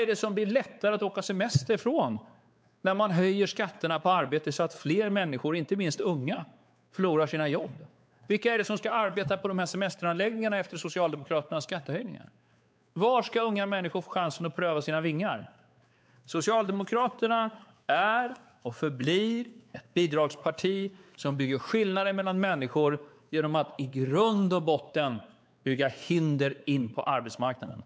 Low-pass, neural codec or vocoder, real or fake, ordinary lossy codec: none; codec, 16 kHz, 4 kbps, X-Codec, WavLM features, trained on Multilingual LibriSpeech; fake; none